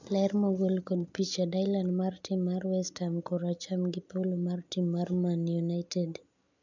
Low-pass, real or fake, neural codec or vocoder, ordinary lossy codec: 7.2 kHz; real; none; none